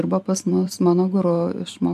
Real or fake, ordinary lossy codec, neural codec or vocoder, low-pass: fake; MP3, 96 kbps; vocoder, 44.1 kHz, 128 mel bands every 512 samples, BigVGAN v2; 14.4 kHz